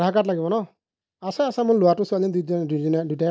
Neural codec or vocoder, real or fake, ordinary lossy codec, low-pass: none; real; none; none